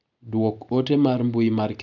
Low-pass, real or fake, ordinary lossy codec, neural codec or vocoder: 7.2 kHz; real; none; none